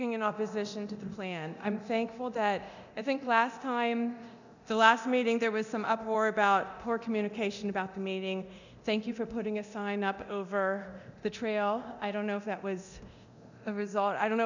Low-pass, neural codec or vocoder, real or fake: 7.2 kHz; codec, 24 kHz, 0.9 kbps, DualCodec; fake